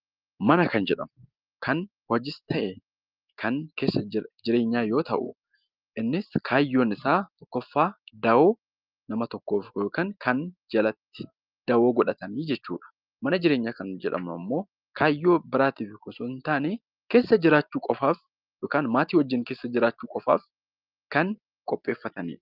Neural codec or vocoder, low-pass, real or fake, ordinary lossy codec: none; 5.4 kHz; real; Opus, 24 kbps